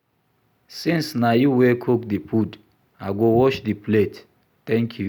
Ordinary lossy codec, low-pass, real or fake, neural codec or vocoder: none; 19.8 kHz; real; none